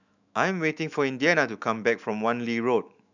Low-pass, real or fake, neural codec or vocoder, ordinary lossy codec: 7.2 kHz; fake; autoencoder, 48 kHz, 128 numbers a frame, DAC-VAE, trained on Japanese speech; none